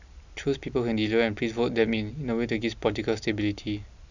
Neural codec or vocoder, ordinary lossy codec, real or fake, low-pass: none; none; real; 7.2 kHz